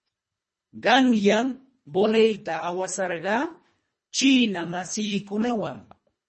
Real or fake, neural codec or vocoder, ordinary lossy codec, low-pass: fake; codec, 24 kHz, 1.5 kbps, HILCodec; MP3, 32 kbps; 10.8 kHz